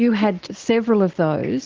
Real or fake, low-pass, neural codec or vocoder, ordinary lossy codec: real; 7.2 kHz; none; Opus, 16 kbps